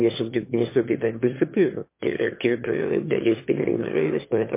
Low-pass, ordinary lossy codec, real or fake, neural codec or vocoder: 3.6 kHz; MP3, 24 kbps; fake; autoencoder, 22.05 kHz, a latent of 192 numbers a frame, VITS, trained on one speaker